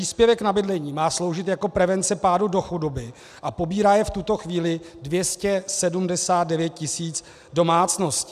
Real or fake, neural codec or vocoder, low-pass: real; none; 14.4 kHz